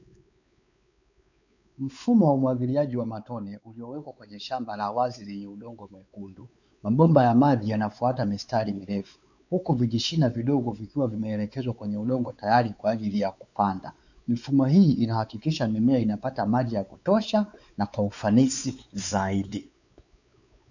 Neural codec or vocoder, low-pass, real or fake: codec, 16 kHz, 4 kbps, X-Codec, WavLM features, trained on Multilingual LibriSpeech; 7.2 kHz; fake